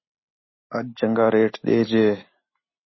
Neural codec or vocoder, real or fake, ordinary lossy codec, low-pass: none; real; MP3, 24 kbps; 7.2 kHz